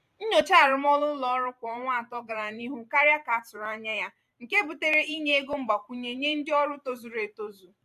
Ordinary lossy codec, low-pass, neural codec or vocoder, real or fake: AAC, 96 kbps; 14.4 kHz; vocoder, 44.1 kHz, 128 mel bands every 512 samples, BigVGAN v2; fake